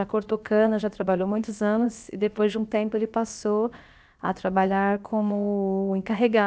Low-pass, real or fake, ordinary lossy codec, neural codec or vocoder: none; fake; none; codec, 16 kHz, about 1 kbps, DyCAST, with the encoder's durations